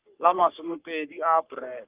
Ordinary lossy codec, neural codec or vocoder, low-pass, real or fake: Opus, 32 kbps; codec, 44.1 kHz, 3.4 kbps, Pupu-Codec; 3.6 kHz; fake